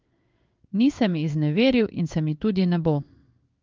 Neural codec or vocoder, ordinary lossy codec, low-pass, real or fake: codec, 16 kHz in and 24 kHz out, 1 kbps, XY-Tokenizer; Opus, 24 kbps; 7.2 kHz; fake